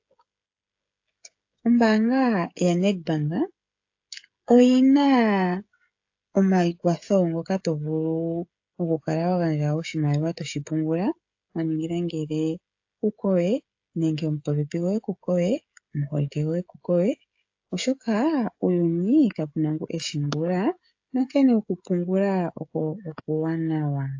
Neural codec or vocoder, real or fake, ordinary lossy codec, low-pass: codec, 16 kHz, 16 kbps, FreqCodec, smaller model; fake; AAC, 48 kbps; 7.2 kHz